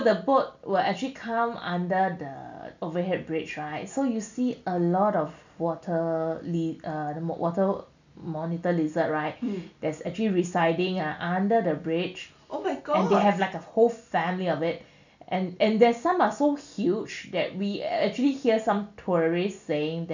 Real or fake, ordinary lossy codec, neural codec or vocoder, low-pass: real; none; none; 7.2 kHz